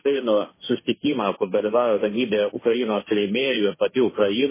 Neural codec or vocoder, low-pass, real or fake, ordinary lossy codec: codec, 16 kHz, 1.1 kbps, Voila-Tokenizer; 3.6 kHz; fake; MP3, 16 kbps